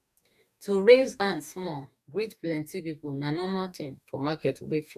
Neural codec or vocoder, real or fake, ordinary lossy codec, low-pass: codec, 44.1 kHz, 2.6 kbps, DAC; fake; none; 14.4 kHz